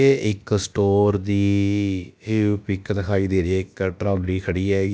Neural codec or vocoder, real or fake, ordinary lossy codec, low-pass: codec, 16 kHz, about 1 kbps, DyCAST, with the encoder's durations; fake; none; none